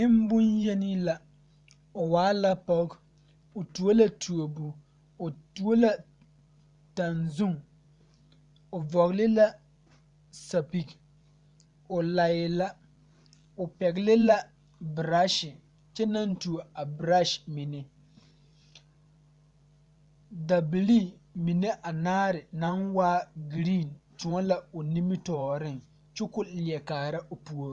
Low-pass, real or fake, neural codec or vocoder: 10.8 kHz; fake; vocoder, 44.1 kHz, 128 mel bands every 512 samples, BigVGAN v2